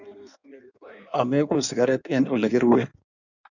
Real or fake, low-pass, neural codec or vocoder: fake; 7.2 kHz; codec, 16 kHz in and 24 kHz out, 1.1 kbps, FireRedTTS-2 codec